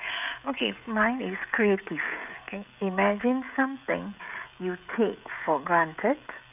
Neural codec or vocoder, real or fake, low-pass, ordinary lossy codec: codec, 16 kHz, 16 kbps, FreqCodec, smaller model; fake; 3.6 kHz; none